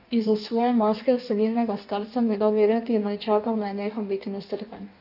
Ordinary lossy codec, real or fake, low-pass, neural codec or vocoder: none; fake; 5.4 kHz; codec, 16 kHz in and 24 kHz out, 1.1 kbps, FireRedTTS-2 codec